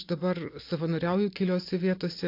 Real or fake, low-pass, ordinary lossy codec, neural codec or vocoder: real; 5.4 kHz; AAC, 32 kbps; none